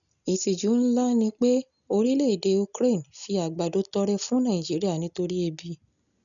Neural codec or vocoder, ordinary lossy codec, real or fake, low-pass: none; none; real; 7.2 kHz